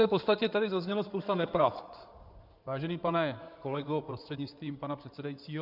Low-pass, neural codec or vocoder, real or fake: 5.4 kHz; codec, 16 kHz in and 24 kHz out, 2.2 kbps, FireRedTTS-2 codec; fake